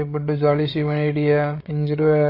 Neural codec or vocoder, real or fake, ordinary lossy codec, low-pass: codec, 16 kHz, 8 kbps, FreqCodec, larger model; fake; MP3, 32 kbps; 5.4 kHz